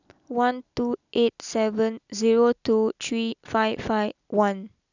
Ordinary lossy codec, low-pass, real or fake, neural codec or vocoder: none; 7.2 kHz; real; none